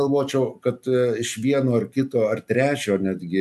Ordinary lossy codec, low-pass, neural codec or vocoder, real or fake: AAC, 96 kbps; 14.4 kHz; none; real